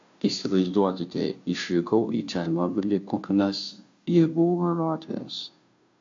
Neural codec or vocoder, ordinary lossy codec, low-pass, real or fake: codec, 16 kHz, 0.5 kbps, FunCodec, trained on Chinese and English, 25 frames a second; AAC, 48 kbps; 7.2 kHz; fake